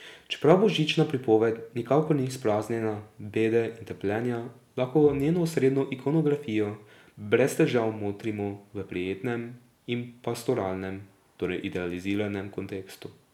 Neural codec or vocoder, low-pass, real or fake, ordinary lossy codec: none; 19.8 kHz; real; none